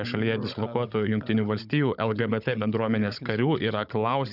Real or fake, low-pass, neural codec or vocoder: fake; 5.4 kHz; codec, 16 kHz, 16 kbps, FunCodec, trained on Chinese and English, 50 frames a second